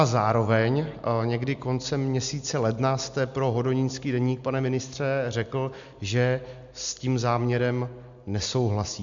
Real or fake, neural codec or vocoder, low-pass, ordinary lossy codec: real; none; 7.2 kHz; MP3, 64 kbps